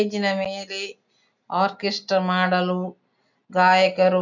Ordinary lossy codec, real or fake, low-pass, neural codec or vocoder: none; real; 7.2 kHz; none